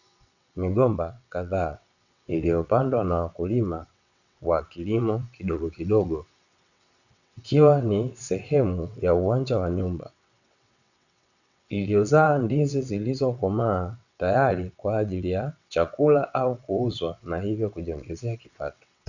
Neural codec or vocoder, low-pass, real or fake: vocoder, 22.05 kHz, 80 mel bands, WaveNeXt; 7.2 kHz; fake